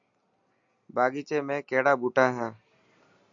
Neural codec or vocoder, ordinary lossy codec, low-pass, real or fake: none; AAC, 64 kbps; 7.2 kHz; real